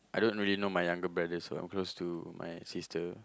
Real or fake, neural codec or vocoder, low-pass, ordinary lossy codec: real; none; none; none